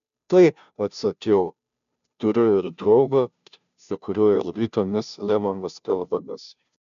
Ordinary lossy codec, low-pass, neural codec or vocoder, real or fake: MP3, 96 kbps; 7.2 kHz; codec, 16 kHz, 0.5 kbps, FunCodec, trained on Chinese and English, 25 frames a second; fake